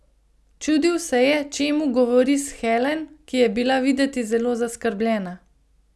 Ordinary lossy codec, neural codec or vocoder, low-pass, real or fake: none; none; none; real